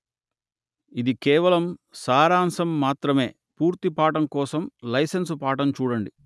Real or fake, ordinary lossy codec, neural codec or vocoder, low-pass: real; none; none; none